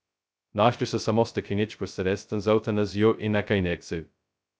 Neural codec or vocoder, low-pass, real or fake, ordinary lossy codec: codec, 16 kHz, 0.2 kbps, FocalCodec; none; fake; none